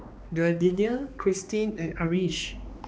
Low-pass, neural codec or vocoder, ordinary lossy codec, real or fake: none; codec, 16 kHz, 2 kbps, X-Codec, HuBERT features, trained on balanced general audio; none; fake